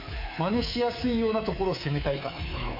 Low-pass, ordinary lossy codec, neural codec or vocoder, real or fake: 5.4 kHz; AAC, 48 kbps; codec, 24 kHz, 3.1 kbps, DualCodec; fake